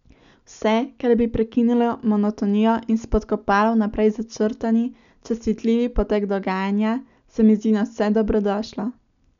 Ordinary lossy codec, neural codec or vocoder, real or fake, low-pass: none; none; real; 7.2 kHz